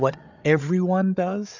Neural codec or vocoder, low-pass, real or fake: codec, 16 kHz, 8 kbps, FreqCodec, larger model; 7.2 kHz; fake